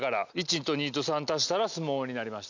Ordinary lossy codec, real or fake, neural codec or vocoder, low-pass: none; real; none; 7.2 kHz